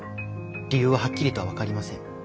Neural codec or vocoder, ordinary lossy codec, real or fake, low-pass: none; none; real; none